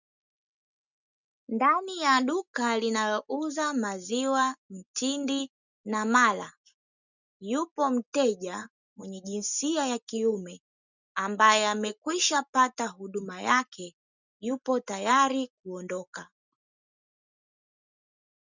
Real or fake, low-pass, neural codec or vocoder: real; 7.2 kHz; none